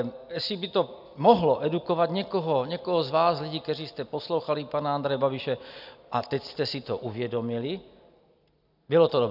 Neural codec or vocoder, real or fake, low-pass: none; real; 5.4 kHz